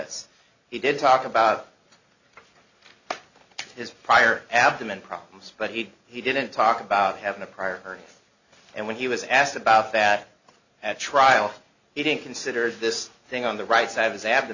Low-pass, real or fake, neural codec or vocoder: 7.2 kHz; real; none